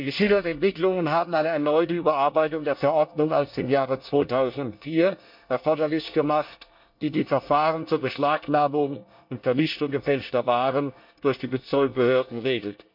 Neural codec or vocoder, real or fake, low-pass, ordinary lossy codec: codec, 24 kHz, 1 kbps, SNAC; fake; 5.4 kHz; MP3, 48 kbps